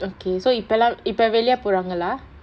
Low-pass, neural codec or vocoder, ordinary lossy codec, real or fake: none; none; none; real